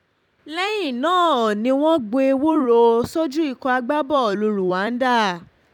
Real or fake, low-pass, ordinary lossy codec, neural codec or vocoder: fake; 19.8 kHz; none; vocoder, 44.1 kHz, 128 mel bands every 256 samples, BigVGAN v2